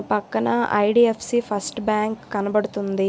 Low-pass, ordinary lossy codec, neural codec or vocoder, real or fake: none; none; none; real